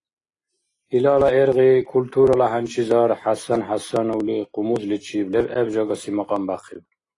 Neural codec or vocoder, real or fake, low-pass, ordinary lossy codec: none; real; 10.8 kHz; AAC, 32 kbps